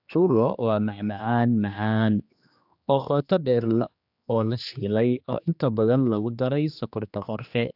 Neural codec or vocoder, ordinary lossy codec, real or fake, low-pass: codec, 16 kHz, 2 kbps, X-Codec, HuBERT features, trained on general audio; AAC, 48 kbps; fake; 5.4 kHz